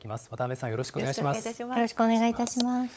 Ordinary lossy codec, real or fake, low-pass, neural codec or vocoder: none; fake; none; codec, 16 kHz, 16 kbps, FunCodec, trained on LibriTTS, 50 frames a second